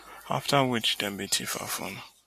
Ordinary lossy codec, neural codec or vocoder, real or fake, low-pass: MP3, 64 kbps; none; real; 14.4 kHz